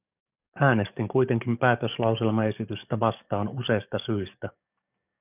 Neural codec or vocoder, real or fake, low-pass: codec, 44.1 kHz, 7.8 kbps, DAC; fake; 3.6 kHz